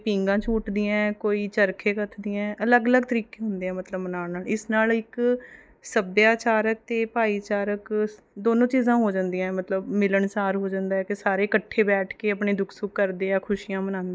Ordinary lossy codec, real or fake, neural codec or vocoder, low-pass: none; real; none; none